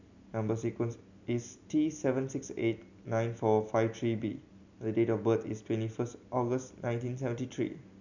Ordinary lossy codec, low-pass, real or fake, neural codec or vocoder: none; 7.2 kHz; real; none